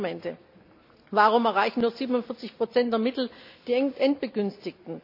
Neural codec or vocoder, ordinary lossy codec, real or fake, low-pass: none; AAC, 48 kbps; real; 5.4 kHz